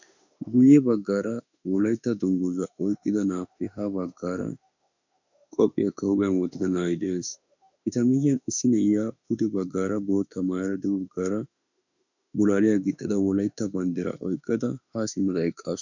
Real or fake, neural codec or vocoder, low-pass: fake; autoencoder, 48 kHz, 32 numbers a frame, DAC-VAE, trained on Japanese speech; 7.2 kHz